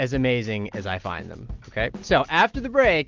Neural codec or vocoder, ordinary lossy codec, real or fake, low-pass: none; Opus, 24 kbps; real; 7.2 kHz